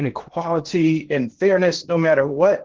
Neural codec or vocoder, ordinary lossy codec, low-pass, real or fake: codec, 16 kHz in and 24 kHz out, 0.8 kbps, FocalCodec, streaming, 65536 codes; Opus, 16 kbps; 7.2 kHz; fake